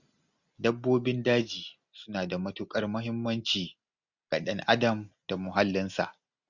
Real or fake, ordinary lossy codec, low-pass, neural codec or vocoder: real; Opus, 64 kbps; 7.2 kHz; none